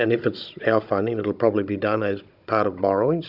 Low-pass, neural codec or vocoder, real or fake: 5.4 kHz; codec, 16 kHz, 16 kbps, FunCodec, trained on Chinese and English, 50 frames a second; fake